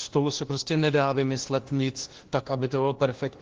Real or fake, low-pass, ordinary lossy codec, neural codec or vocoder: fake; 7.2 kHz; Opus, 16 kbps; codec, 16 kHz, 1 kbps, FunCodec, trained on LibriTTS, 50 frames a second